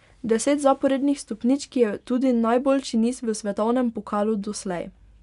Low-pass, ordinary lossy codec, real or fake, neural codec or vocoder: 10.8 kHz; none; real; none